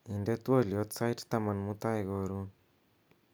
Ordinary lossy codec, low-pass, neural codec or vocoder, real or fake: none; none; none; real